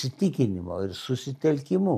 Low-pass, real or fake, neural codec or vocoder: 14.4 kHz; real; none